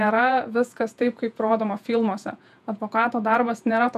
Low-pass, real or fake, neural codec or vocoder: 14.4 kHz; fake; vocoder, 48 kHz, 128 mel bands, Vocos